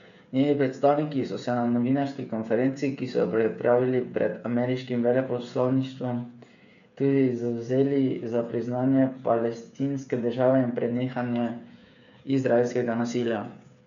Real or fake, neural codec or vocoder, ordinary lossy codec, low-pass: fake; codec, 16 kHz, 8 kbps, FreqCodec, smaller model; MP3, 96 kbps; 7.2 kHz